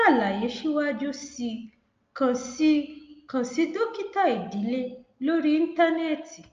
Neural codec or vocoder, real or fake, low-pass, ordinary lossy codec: none; real; 7.2 kHz; Opus, 24 kbps